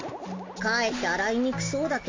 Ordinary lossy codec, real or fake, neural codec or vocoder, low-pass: AAC, 32 kbps; real; none; 7.2 kHz